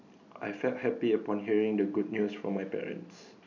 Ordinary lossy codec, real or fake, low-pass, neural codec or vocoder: none; real; 7.2 kHz; none